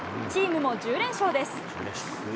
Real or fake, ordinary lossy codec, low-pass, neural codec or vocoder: real; none; none; none